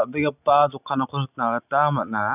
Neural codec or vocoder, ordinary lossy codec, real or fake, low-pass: none; none; real; 3.6 kHz